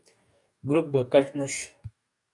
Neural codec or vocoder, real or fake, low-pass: codec, 44.1 kHz, 2.6 kbps, DAC; fake; 10.8 kHz